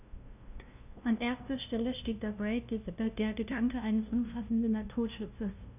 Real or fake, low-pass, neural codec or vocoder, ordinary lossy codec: fake; 3.6 kHz; codec, 16 kHz, 0.5 kbps, FunCodec, trained on LibriTTS, 25 frames a second; none